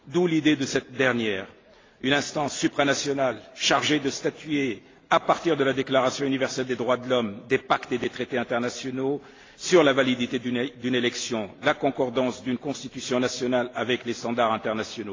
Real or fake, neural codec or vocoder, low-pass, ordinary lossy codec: real; none; 7.2 kHz; AAC, 32 kbps